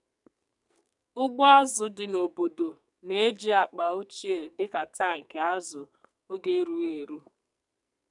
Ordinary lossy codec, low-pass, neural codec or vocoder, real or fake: none; 10.8 kHz; codec, 44.1 kHz, 2.6 kbps, SNAC; fake